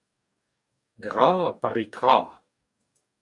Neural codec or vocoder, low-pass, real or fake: codec, 44.1 kHz, 2.6 kbps, DAC; 10.8 kHz; fake